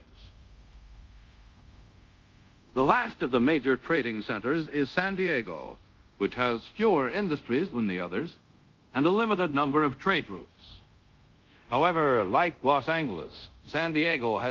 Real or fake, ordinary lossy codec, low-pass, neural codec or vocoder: fake; Opus, 32 kbps; 7.2 kHz; codec, 24 kHz, 0.5 kbps, DualCodec